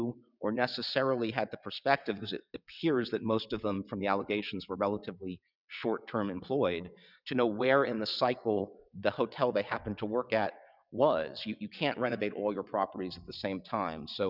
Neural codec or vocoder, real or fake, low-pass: vocoder, 22.05 kHz, 80 mel bands, WaveNeXt; fake; 5.4 kHz